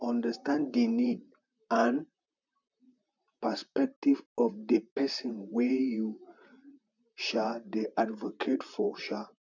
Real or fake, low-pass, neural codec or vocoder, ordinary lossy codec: fake; 7.2 kHz; vocoder, 44.1 kHz, 128 mel bands, Pupu-Vocoder; none